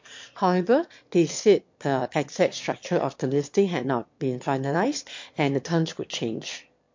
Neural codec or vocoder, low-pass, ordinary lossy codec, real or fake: autoencoder, 22.05 kHz, a latent of 192 numbers a frame, VITS, trained on one speaker; 7.2 kHz; MP3, 48 kbps; fake